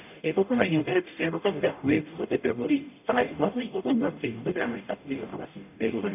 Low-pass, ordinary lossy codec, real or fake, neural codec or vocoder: 3.6 kHz; none; fake; codec, 44.1 kHz, 0.9 kbps, DAC